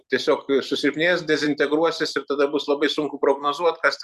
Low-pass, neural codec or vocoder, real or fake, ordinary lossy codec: 14.4 kHz; vocoder, 44.1 kHz, 128 mel bands every 256 samples, BigVGAN v2; fake; Opus, 64 kbps